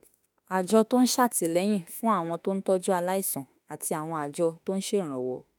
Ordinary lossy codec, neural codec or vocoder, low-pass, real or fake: none; autoencoder, 48 kHz, 32 numbers a frame, DAC-VAE, trained on Japanese speech; none; fake